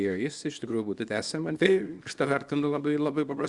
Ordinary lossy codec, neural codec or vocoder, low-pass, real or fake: Opus, 64 kbps; codec, 24 kHz, 0.9 kbps, WavTokenizer, small release; 10.8 kHz; fake